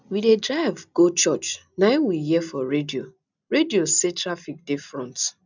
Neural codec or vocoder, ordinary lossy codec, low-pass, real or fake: none; none; 7.2 kHz; real